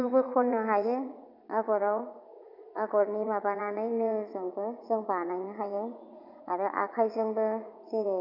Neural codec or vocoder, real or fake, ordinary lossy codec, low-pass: vocoder, 44.1 kHz, 80 mel bands, Vocos; fake; none; 5.4 kHz